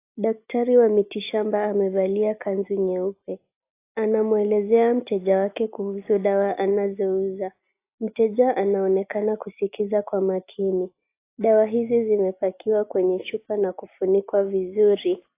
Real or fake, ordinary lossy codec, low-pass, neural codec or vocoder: real; AAC, 24 kbps; 3.6 kHz; none